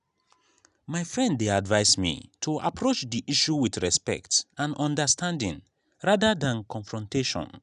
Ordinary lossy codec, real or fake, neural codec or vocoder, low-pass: none; real; none; 10.8 kHz